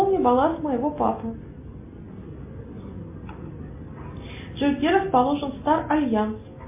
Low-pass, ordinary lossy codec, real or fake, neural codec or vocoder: 3.6 kHz; MP3, 24 kbps; real; none